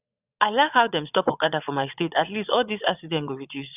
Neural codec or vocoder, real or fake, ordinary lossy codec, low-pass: vocoder, 22.05 kHz, 80 mel bands, Vocos; fake; none; 3.6 kHz